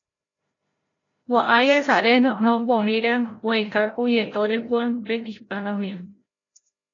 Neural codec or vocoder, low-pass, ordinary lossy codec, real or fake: codec, 16 kHz, 0.5 kbps, FreqCodec, larger model; 7.2 kHz; AAC, 32 kbps; fake